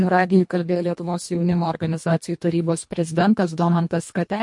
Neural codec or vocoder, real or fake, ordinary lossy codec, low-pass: codec, 24 kHz, 1.5 kbps, HILCodec; fake; MP3, 48 kbps; 10.8 kHz